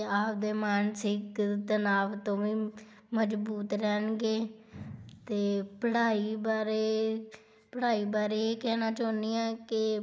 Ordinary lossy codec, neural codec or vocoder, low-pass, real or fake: none; none; none; real